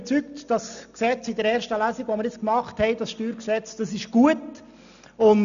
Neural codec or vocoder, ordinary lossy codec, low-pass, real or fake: none; none; 7.2 kHz; real